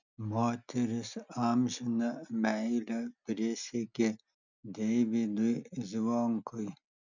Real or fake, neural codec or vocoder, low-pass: real; none; 7.2 kHz